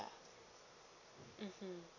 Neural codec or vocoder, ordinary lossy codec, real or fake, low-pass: none; none; real; 7.2 kHz